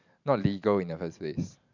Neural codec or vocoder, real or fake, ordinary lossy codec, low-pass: vocoder, 44.1 kHz, 128 mel bands every 512 samples, BigVGAN v2; fake; none; 7.2 kHz